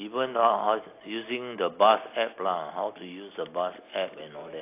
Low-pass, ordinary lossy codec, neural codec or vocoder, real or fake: 3.6 kHz; AAC, 24 kbps; none; real